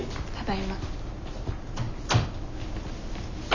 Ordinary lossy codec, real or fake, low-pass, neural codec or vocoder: none; real; 7.2 kHz; none